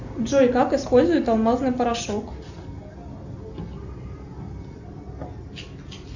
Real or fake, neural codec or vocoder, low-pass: real; none; 7.2 kHz